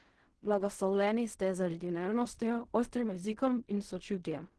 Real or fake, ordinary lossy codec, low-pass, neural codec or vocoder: fake; Opus, 16 kbps; 10.8 kHz; codec, 16 kHz in and 24 kHz out, 0.4 kbps, LongCat-Audio-Codec, fine tuned four codebook decoder